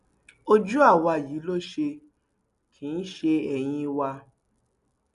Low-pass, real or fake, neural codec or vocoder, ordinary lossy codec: 10.8 kHz; real; none; none